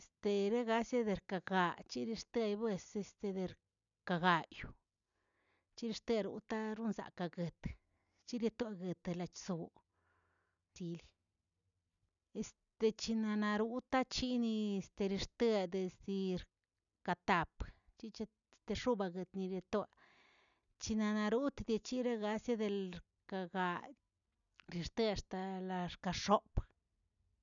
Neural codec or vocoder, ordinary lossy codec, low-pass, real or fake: none; none; 7.2 kHz; real